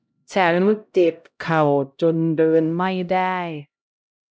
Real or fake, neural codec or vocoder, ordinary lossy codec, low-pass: fake; codec, 16 kHz, 0.5 kbps, X-Codec, HuBERT features, trained on LibriSpeech; none; none